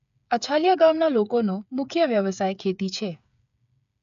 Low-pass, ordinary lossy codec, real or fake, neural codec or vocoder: 7.2 kHz; AAC, 96 kbps; fake; codec, 16 kHz, 8 kbps, FreqCodec, smaller model